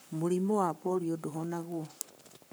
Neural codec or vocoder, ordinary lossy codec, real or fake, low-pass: codec, 44.1 kHz, 7.8 kbps, Pupu-Codec; none; fake; none